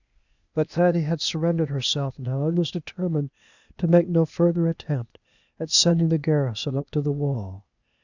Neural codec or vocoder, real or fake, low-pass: codec, 16 kHz, 0.8 kbps, ZipCodec; fake; 7.2 kHz